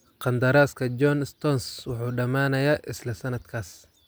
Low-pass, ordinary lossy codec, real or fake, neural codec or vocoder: none; none; real; none